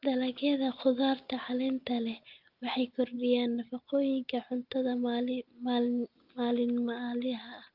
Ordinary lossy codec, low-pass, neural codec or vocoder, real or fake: Opus, 32 kbps; 5.4 kHz; none; real